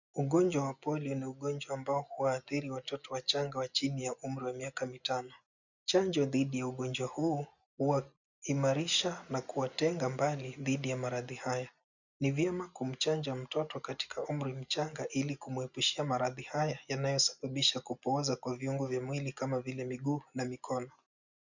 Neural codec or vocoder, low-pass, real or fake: none; 7.2 kHz; real